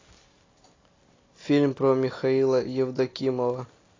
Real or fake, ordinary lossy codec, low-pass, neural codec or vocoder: real; AAC, 32 kbps; 7.2 kHz; none